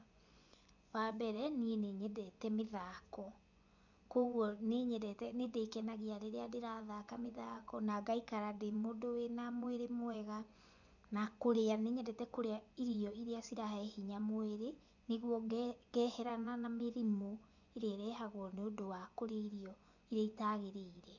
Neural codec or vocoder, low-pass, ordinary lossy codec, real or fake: vocoder, 24 kHz, 100 mel bands, Vocos; 7.2 kHz; none; fake